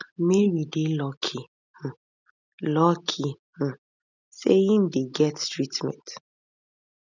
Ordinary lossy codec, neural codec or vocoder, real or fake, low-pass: none; none; real; 7.2 kHz